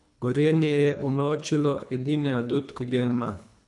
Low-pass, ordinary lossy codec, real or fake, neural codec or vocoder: 10.8 kHz; none; fake; codec, 24 kHz, 1.5 kbps, HILCodec